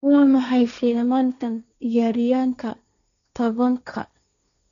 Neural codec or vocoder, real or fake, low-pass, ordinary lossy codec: codec, 16 kHz, 1.1 kbps, Voila-Tokenizer; fake; 7.2 kHz; none